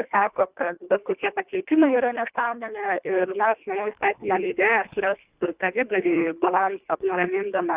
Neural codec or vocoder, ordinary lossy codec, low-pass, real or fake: codec, 24 kHz, 1.5 kbps, HILCodec; Opus, 64 kbps; 3.6 kHz; fake